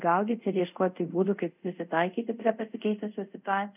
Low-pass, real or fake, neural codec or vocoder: 3.6 kHz; fake; codec, 24 kHz, 0.5 kbps, DualCodec